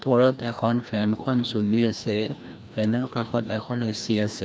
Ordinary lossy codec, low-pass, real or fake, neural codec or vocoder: none; none; fake; codec, 16 kHz, 1 kbps, FreqCodec, larger model